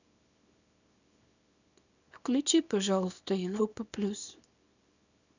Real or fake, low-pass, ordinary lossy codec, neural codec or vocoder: fake; 7.2 kHz; none; codec, 24 kHz, 0.9 kbps, WavTokenizer, small release